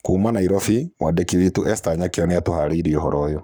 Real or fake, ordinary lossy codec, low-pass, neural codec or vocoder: fake; none; none; codec, 44.1 kHz, 7.8 kbps, Pupu-Codec